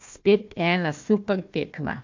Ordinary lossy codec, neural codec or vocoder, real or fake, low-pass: MP3, 48 kbps; codec, 16 kHz, 1 kbps, FunCodec, trained on Chinese and English, 50 frames a second; fake; 7.2 kHz